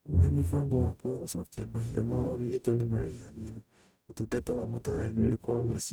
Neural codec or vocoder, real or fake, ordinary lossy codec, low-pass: codec, 44.1 kHz, 0.9 kbps, DAC; fake; none; none